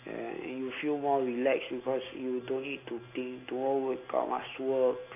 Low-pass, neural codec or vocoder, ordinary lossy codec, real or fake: 3.6 kHz; none; AAC, 24 kbps; real